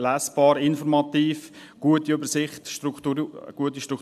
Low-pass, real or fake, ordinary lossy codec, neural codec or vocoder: 14.4 kHz; real; AAC, 96 kbps; none